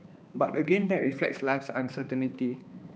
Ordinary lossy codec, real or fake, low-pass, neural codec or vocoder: none; fake; none; codec, 16 kHz, 2 kbps, X-Codec, HuBERT features, trained on balanced general audio